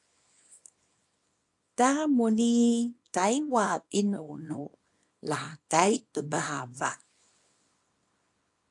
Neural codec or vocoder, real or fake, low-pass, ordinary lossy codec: codec, 24 kHz, 0.9 kbps, WavTokenizer, small release; fake; 10.8 kHz; AAC, 64 kbps